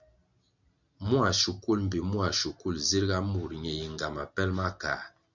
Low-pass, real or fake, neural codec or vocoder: 7.2 kHz; real; none